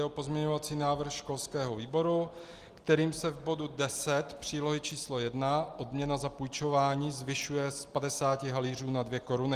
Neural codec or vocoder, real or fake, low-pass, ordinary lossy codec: none; real; 14.4 kHz; Opus, 24 kbps